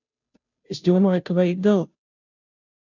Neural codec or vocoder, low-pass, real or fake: codec, 16 kHz, 0.5 kbps, FunCodec, trained on Chinese and English, 25 frames a second; 7.2 kHz; fake